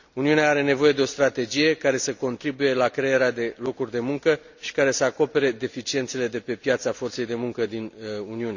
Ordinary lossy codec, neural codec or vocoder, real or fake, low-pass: none; none; real; 7.2 kHz